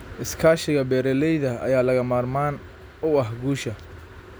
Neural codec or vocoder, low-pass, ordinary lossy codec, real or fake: none; none; none; real